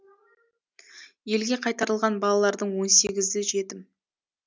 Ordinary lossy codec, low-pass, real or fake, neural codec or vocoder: none; none; real; none